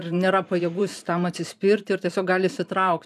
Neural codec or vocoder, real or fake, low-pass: vocoder, 44.1 kHz, 128 mel bands every 512 samples, BigVGAN v2; fake; 14.4 kHz